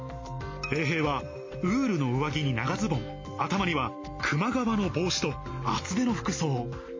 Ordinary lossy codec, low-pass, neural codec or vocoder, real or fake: MP3, 32 kbps; 7.2 kHz; none; real